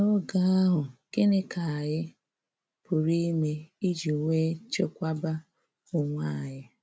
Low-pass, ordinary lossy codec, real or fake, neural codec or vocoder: none; none; real; none